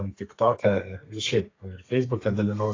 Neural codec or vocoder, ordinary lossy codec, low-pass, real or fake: codec, 32 kHz, 1.9 kbps, SNAC; AAC, 32 kbps; 7.2 kHz; fake